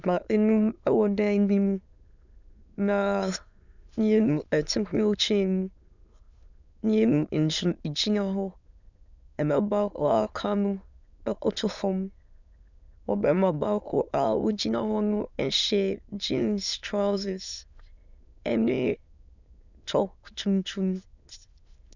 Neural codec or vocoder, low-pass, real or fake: autoencoder, 22.05 kHz, a latent of 192 numbers a frame, VITS, trained on many speakers; 7.2 kHz; fake